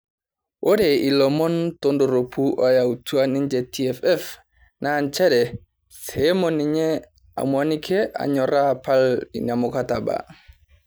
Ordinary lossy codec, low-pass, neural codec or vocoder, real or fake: none; none; none; real